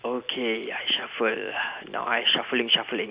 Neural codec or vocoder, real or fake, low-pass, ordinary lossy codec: none; real; 3.6 kHz; Opus, 64 kbps